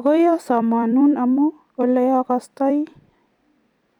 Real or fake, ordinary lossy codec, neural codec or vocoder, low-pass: fake; none; vocoder, 44.1 kHz, 128 mel bands every 256 samples, BigVGAN v2; 19.8 kHz